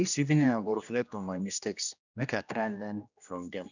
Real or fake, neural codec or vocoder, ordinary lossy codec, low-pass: fake; codec, 16 kHz, 1 kbps, X-Codec, HuBERT features, trained on general audio; none; 7.2 kHz